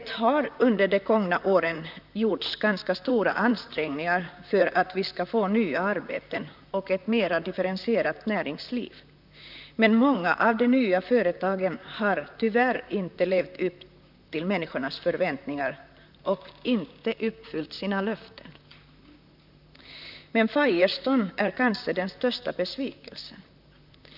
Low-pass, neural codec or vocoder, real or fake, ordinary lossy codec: 5.4 kHz; vocoder, 44.1 kHz, 128 mel bands, Pupu-Vocoder; fake; none